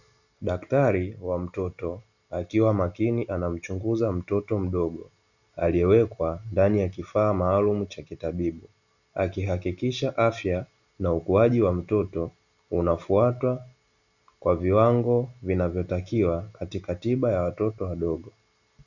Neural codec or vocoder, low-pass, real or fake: none; 7.2 kHz; real